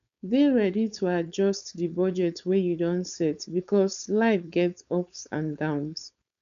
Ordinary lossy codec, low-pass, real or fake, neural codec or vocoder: none; 7.2 kHz; fake; codec, 16 kHz, 4.8 kbps, FACodec